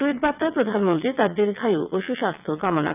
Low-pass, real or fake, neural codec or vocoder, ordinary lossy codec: 3.6 kHz; fake; vocoder, 22.05 kHz, 80 mel bands, WaveNeXt; none